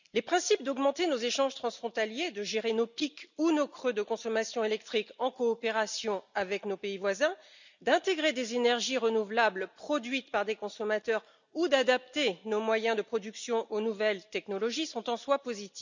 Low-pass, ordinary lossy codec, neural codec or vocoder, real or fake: 7.2 kHz; none; none; real